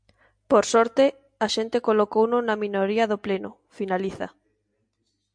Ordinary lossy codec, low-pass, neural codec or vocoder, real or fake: MP3, 64 kbps; 9.9 kHz; none; real